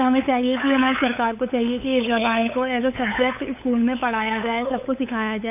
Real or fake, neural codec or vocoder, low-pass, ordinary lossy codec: fake; codec, 16 kHz, 8 kbps, FunCodec, trained on LibriTTS, 25 frames a second; 3.6 kHz; none